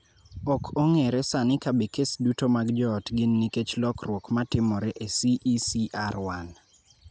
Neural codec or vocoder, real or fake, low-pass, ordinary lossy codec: none; real; none; none